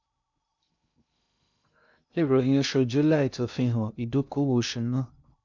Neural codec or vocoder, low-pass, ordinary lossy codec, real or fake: codec, 16 kHz in and 24 kHz out, 0.6 kbps, FocalCodec, streaming, 2048 codes; 7.2 kHz; none; fake